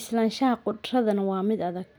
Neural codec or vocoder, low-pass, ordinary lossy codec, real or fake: none; none; none; real